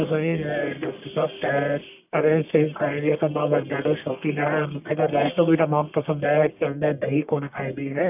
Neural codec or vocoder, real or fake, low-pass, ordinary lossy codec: codec, 44.1 kHz, 1.7 kbps, Pupu-Codec; fake; 3.6 kHz; none